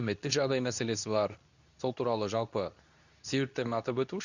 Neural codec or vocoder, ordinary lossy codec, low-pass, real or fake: codec, 24 kHz, 0.9 kbps, WavTokenizer, medium speech release version 2; none; 7.2 kHz; fake